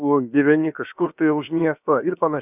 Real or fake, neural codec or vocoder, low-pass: fake; codec, 16 kHz, about 1 kbps, DyCAST, with the encoder's durations; 3.6 kHz